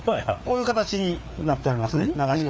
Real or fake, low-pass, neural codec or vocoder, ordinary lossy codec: fake; none; codec, 16 kHz, 4 kbps, FreqCodec, larger model; none